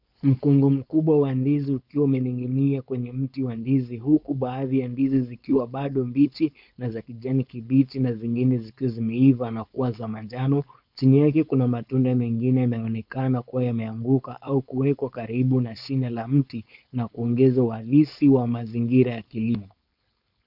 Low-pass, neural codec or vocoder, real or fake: 5.4 kHz; codec, 16 kHz, 4.8 kbps, FACodec; fake